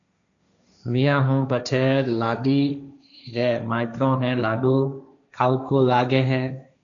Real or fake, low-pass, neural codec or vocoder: fake; 7.2 kHz; codec, 16 kHz, 1.1 kbps, Voila-Tokenizer